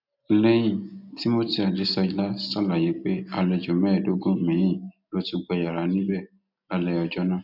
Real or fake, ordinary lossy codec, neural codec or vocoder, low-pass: real; none; none; 5.4 kHz